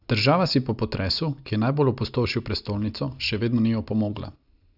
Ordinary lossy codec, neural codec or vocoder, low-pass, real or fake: none; none; 5.4 kHz; real